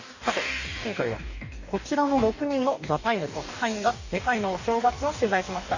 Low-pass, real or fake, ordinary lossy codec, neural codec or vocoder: 7.2 kHz; fake; none; codec, 44.1 kHz, 2.6 kbps, DAC